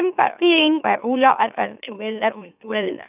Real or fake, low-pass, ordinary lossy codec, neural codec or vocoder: fake; 3.6 kHz; none; autoencoder, 44.1 kHz, a latent of 192 numbers a frame, MeloTTS